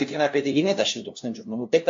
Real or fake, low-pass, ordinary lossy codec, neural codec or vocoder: fake; 7.2 kHz; AAC, 48 kbps; codec, 16 kHz, 0.5 kbps, FunCodec, trained on LibriTTS, 25 frames a second